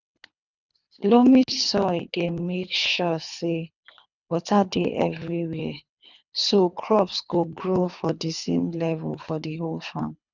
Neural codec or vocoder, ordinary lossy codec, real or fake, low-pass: codec, 24 kHz, 3 kbps, HILCodec; none; fake; 7.2 kHz